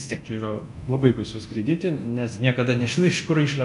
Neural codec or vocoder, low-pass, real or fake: codec, 24 kHz, 0.9 kbps, DualCodec; 10.8 kHz; fake